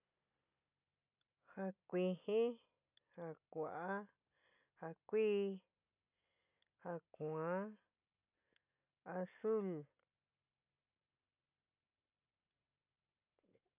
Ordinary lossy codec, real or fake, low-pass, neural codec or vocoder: none; real; 3.6 kHz; none